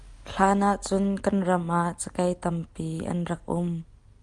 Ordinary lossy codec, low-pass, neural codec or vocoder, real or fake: Opus, 32 kbps; 10.8 kHz; none; real